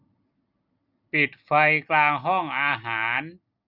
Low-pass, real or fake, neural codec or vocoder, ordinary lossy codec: 5.4 kHz; real; none; none